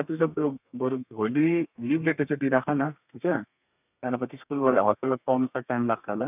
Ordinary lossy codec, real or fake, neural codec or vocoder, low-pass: none; fake; codec, 32 kHz, 1.9 kbps, SNAC; 3.6 kHz